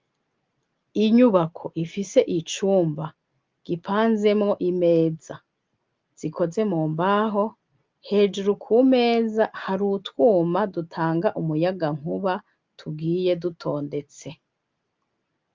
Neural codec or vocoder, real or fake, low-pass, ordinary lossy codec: none; real; 7.2 kHz; Opus, 32 kbps